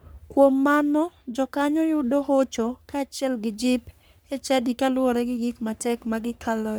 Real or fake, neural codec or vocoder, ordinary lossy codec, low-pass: fake; codec, 44.1 kHz, 3.4 kbps, Pupu-Codec; none; none